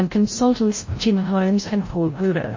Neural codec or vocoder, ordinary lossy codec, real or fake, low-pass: codec, 16 kHz, 0.5 kbps, FreqCodec, larger model; MP3, 32 kbps; fake; 7.2 kHz